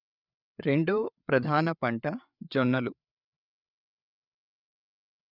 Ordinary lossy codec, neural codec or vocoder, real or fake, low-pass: none; codec, 16 kHz, 8 kbps, FreqCodec, larger model; fake; 5.4 kHz